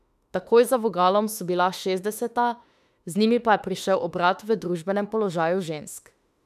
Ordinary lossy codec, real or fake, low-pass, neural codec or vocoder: none; fake; 14.4 kHz; autoencoder, 48 kHz, 32 numbers a frame, DAC-VAE, trained on Japanese speech